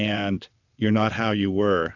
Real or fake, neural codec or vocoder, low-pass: fake; vocoder, 22.05 kHz, 80 mel bands, WaveNeXt; 7.2 kHz